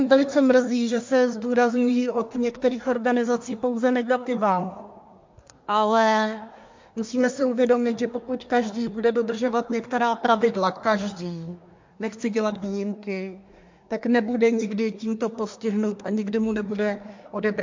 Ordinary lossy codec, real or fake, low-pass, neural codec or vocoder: MP3, 48 kbps; fake; 7.2 kHz; codec, 24 kHz, 1 kbps, SNAC